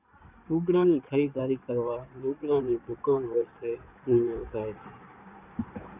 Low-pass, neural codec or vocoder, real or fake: 3.6 kHz; codec, 16 kHz in and 24 kHz out, 2.2 kbps, FireRedTTS-2 codec; fake